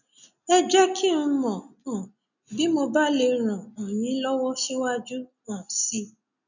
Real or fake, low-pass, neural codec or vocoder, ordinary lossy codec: real; 7.2 kHz; none; none